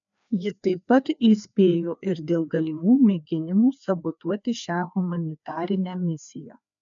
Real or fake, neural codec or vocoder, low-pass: fake; codec, 16 kHz, 2 kbps, FreqCodec, larger model; 7.2 kHz